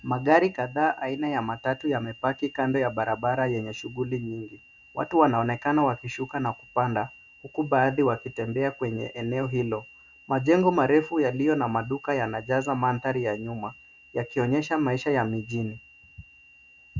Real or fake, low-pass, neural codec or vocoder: real; 7.2 kHz; none